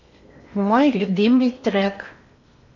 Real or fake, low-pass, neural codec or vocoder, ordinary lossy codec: fake; 7.2 kHz; codec, 16 kHz in and 24 kHz out, 0.8 kbps, FocalCodec, streaming, 65536 codes; none